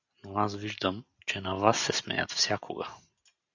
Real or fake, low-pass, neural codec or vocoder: real; 7.2 kHz; none